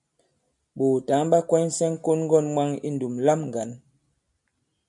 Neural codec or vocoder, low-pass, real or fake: none; 10.8 kHz; real